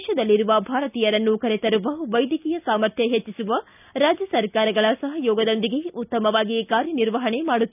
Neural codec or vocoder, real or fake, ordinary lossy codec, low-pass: none; real; none; 3.6 kHz